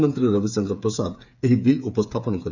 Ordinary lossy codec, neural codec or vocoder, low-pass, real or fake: none; codec, 16 kHz, 8 kbps, FreqCodec, smaller model; 7.2 kHz; fake